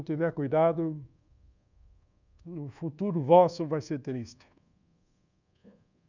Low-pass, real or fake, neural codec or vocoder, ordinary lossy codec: 7.2 kHz; fake; codec, 24 kHz, 1.2 kbps, DualCodec; none